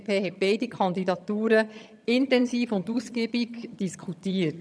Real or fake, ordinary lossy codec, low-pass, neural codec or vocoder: fake; none; none; vocoder, 22.05 kHz, 80 mel bands, HiFi-GAN